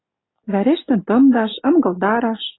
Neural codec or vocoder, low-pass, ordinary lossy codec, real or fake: none; 7.2 kHz; AAC, 16 kbps; real